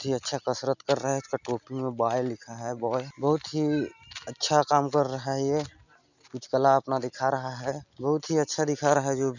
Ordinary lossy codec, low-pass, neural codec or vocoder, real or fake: none; 7.2 kHz; none; real